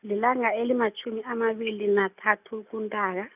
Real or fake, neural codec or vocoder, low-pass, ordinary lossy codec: real; none; 3.6 kHz; none